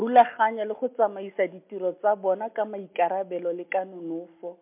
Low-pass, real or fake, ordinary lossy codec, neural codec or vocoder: 3.6 kHz; real; MP3, 32 kbps; none